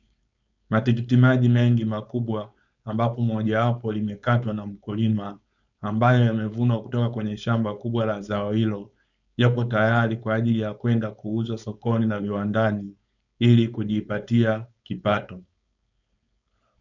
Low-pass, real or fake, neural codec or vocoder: 7.2 kHz; fake; codec, 16 kHz, 4.8 kbps, FACodec